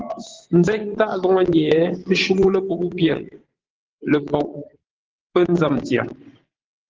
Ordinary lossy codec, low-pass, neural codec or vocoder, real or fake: Opus, 16 kbps; 7.2 kHz; vocoder, 22.05 kHz, 80 mel bands, WaveNeXt; fake